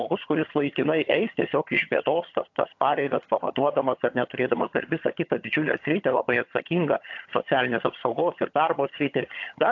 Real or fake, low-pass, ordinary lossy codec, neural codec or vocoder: fake; 7.2 kHz; AAC, 48 kbps; vocoder, 22.05 kHz, 80 mel bands, HiFi-GAN